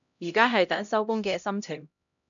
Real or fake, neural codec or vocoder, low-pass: fake; codec, 16 kHz, 0.5 kbps, X-Codec, HuBERT features, trained on LibriSpeech; 7.2 kHz